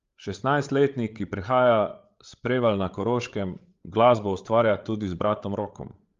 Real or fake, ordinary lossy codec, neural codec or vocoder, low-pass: fake; Opus, 32 kbps; codec, 16 kHz, 8 kbps, FreqCodec, larger model; 7.2 kHz